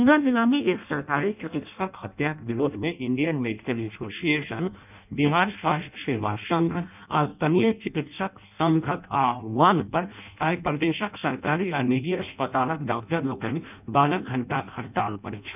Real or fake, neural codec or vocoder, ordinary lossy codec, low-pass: fake; codec, 16 kHz in and 24 kHz out, 0.6 kbps, FireRedTTS-2 codec; none; 3.6 kHz